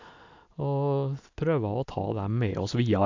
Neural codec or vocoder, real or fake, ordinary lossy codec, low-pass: none; real; AAC, 48 kbps; 7.2 kHz